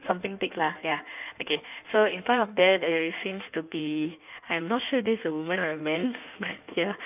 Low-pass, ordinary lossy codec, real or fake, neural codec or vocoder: 3.6 kHz; none; fake; codec, 16 kHz in and 24 kHz out, 1.1 kbps, FireRedTTS-2 codec